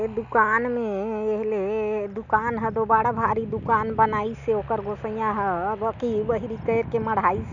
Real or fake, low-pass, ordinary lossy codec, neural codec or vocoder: real; 7.2 kHz; none; none